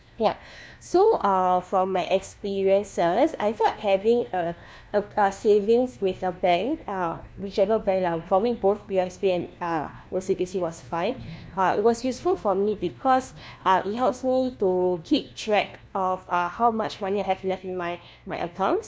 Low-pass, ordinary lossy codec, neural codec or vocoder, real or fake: none; none; codec, 16 kHz, 1 kbps, FunCodec, trained on LibriTTS, 50 frames a second; fake